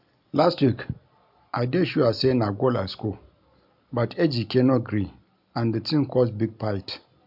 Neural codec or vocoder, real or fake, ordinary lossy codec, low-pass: none; real; none; 5.4 kHz